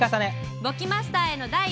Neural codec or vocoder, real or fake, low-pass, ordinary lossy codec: none; real; none; none